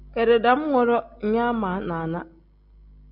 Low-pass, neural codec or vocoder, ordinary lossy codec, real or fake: 5.4 kHz; none; Opus, 64 kbps; real